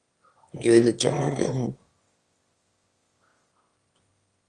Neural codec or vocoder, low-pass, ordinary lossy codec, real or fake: autoencoder, 22.05 kHz, a latent of 192 numbers a frame, VITS, trained on one speaker; 9.9 kHz; Opus, 32 kbps; fake